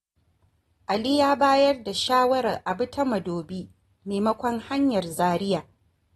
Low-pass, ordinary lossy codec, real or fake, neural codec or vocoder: 19.8 kHz; AAC, 32 kbps; real; none